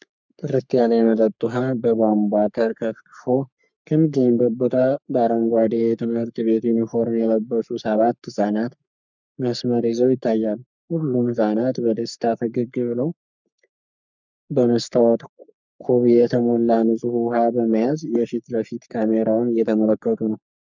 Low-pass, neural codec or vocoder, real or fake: 7.2 kHz; codec, 44.1 kHz, 3.4 kbps, Pupu-Codec; fake